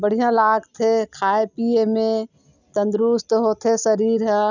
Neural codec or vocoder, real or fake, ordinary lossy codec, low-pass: none; real; none; 7.2 kHz